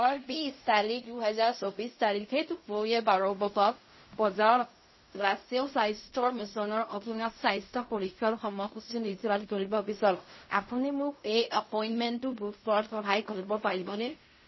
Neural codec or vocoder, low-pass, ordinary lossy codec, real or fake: codec, 16 kHz in and 24 kHz out, 0.4 kbps, LongCat-Audio-Codec, fine tuned four codebook decoder; 7.2 kHz; MP3, 24 kbps; fake